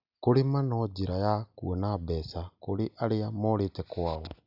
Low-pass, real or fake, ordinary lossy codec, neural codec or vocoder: 5.4 kHz; real; none; none